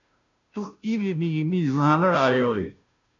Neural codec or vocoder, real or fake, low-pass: codec, 16 kHz, 0.5 kbps, FunCodec, trained on Chinese and English, 25 frames a second; fake; 7.2 kHz